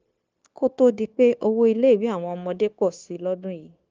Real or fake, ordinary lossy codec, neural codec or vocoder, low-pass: fake; Opus, 24 kbps; codec, 16 kHz, 0.9 kbps, LongCat-Audio-Codec; 7.2 kHz